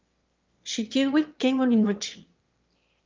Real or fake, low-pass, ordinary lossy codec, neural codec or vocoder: fake; 7.2 kHz; Opus, 24 kbps; autoencoder, 22.05 kHz, a latent of 192 numbers a frame, VITS, trained on one speaker